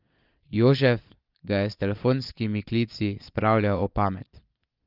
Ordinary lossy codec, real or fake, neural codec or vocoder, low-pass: Opus, 24 kbps; fake; vocoder, 22.05 kHz, 80 mel bands, Vocos; 5.4 kHz